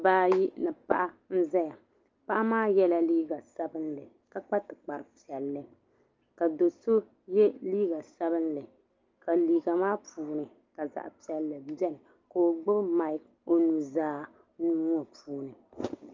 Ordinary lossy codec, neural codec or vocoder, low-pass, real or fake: Opus, 24 kbps; none; 7.2 kHz; real